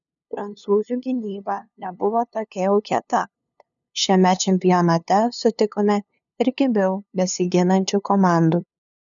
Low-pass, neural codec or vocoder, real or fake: 7.2 kHz; codec, 16 kHz, 2 kbps, FunCodec, trained on LibriTTS, 25 frames a second; fake